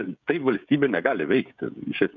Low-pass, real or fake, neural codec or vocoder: 7.2 kHz; fake; codec, 16 kHz, 16 kbps, FreqCodec, smaller model